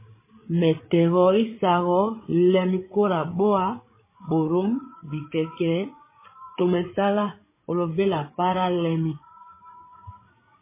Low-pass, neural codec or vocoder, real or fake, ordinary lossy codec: 3.6 kHz; codec, 16 kHz, 8 kbps, FreqCodec, larger model; fake; MP3, 16 kbps